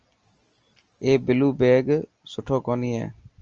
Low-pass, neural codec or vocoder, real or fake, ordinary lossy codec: 7.2 kHz; none; real; Opus, 24 kbps